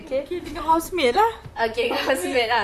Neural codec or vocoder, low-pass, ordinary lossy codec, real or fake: vocoder, 44.1 kHz, 128 mel bands, Pupu-Vocoder; 14.4 kHz; none; fake